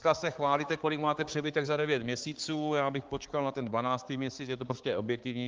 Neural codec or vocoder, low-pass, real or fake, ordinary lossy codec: codec, 16 kHz, 4 kbps, X-Codec, HuBERT features, trained on balanced general audio; 7.2 kHz; fake; Opus, 16 kbps